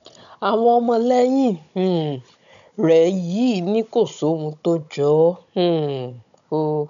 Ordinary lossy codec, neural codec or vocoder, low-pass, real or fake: none; codec, 16 kHz, 16 kbps, FunCodec, trained on Chinese and English, 50 frames a second; 7.2 kHz; fake